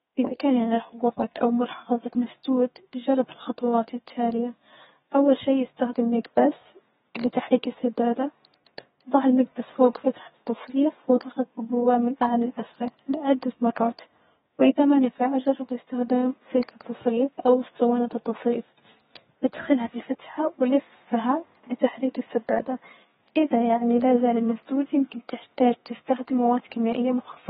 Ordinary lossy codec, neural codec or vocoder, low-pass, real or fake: AAC, 16 kbps; codec, 32 kHz, 1.9 kbps, SNAC; 14.4 kHz; fake